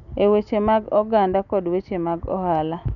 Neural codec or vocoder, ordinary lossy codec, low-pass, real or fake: none; none; 7.2 kHz; real